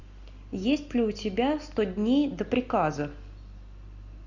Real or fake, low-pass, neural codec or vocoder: real; 7.2 kHz; none